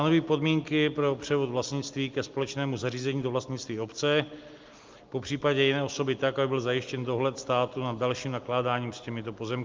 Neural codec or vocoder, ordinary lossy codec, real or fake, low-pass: none; Opus, 32 kbps; real; 7.2 kHz